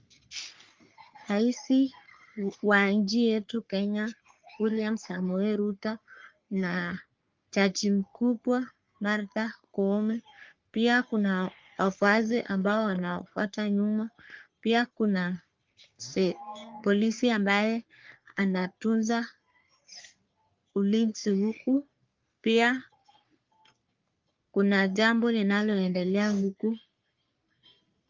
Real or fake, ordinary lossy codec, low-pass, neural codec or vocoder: fake; Opus, 24 kbps; 7.2 kHz; codec, 44.1 kHz, 3.4 kbps, Pupu-Codec